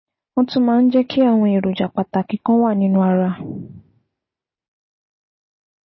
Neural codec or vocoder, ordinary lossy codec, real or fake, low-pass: none; MP3, 24 kbps; real; 7.2 kHz